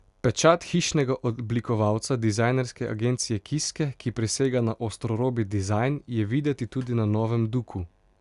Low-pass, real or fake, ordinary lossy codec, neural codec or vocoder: 10.8 kHz; real; Opus, 64 kbps; none